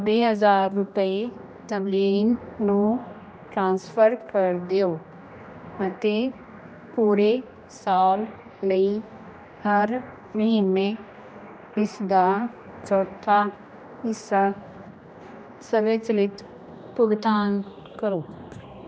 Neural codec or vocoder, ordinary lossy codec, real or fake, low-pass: codec, 16 kHz, 1 kbps, X-Codec, HuBERT features, trained on general audio; none; fake; none